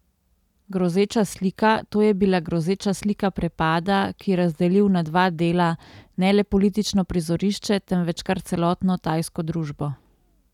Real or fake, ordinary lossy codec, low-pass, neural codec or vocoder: real; none; 19.8 kHz; none